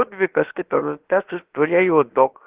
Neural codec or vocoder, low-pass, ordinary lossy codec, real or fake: codec, 16 kHz, about 1 kbps, DyCAST, with the encoder's durations; 3.6 kHz; Opus, 16 kbps; fake